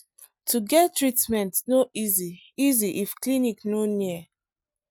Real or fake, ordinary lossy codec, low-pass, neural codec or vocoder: real; none; none; none